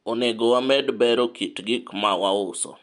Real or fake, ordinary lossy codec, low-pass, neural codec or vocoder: real; MP3, 64 kbps; 10.8 kHz; none